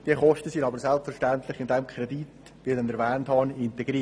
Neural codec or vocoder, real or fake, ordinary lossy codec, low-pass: none; real; none; none